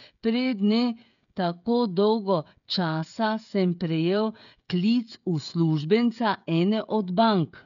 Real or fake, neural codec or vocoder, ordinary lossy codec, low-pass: fake; codec, 16 kHz, 16 kbps, FreqCodec, smaller model; none; 7.2 kHz